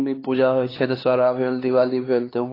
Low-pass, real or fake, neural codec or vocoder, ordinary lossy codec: 5.4 kHz; fake; codec, 16 kHz, 2 kbps, X-Codec, HuBERT features, trained on LibriSpeech; AAC, 24 kbps